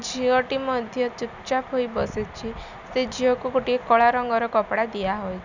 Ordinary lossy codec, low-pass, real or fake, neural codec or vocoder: none; 7.2 kHz; real; none